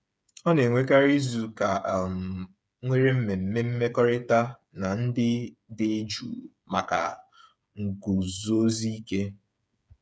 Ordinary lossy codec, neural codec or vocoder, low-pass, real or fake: none; codec, 16 kHz, 8 kbps, FreqCodec, smaller model; none; fake